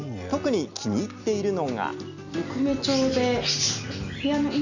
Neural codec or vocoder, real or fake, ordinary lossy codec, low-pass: none; real; none; 7.2 kHz